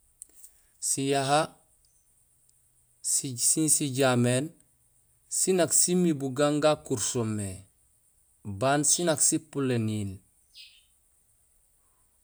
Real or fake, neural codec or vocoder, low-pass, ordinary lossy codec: real; none; none; none